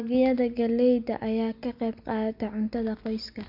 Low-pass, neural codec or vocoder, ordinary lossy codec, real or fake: 5.4 kHz; none; none; real